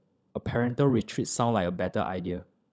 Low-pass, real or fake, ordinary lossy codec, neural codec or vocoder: none; fake; none; codec, 16 kHz, 16 kbps, FunCodec, trained on LibriTTS, 50 frames a second